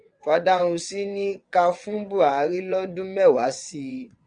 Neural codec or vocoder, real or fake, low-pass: vocoder, 22.05 kHz, 80 mel bands, WaveNeXt; fake; 9.9 kHz